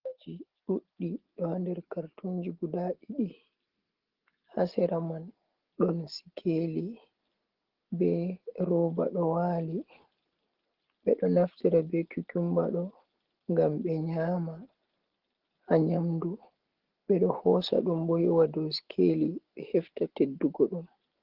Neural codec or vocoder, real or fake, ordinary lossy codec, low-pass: none; real; Opus, 16 kbps; 5.4 kHz